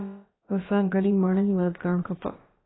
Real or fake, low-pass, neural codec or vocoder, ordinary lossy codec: fake; 7.2 kHz; codec, 16 kHz, about 1 kbps, DyCAST, with the encoder's durations; AAC, 16 kbps